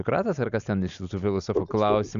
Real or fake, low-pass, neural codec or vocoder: real; 7.2 kHz; none